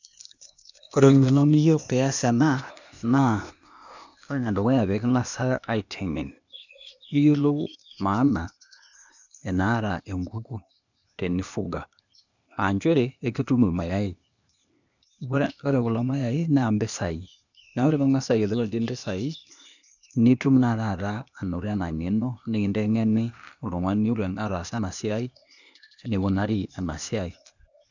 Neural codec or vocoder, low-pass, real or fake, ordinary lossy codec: codec, 16 kHz, 0.8 kbps, ZipCodec; 7.2 kHz; fake; none